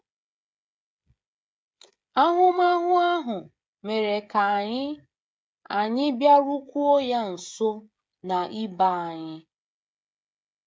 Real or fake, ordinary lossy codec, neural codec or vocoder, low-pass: fake; none; codec, 16 kHz, 16 kbps, FreqCodec, smaller model; none